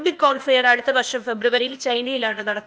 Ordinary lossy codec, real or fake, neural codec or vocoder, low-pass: none; fake; codec, 16 kHz, 0.8 kbps, ZipCodec; none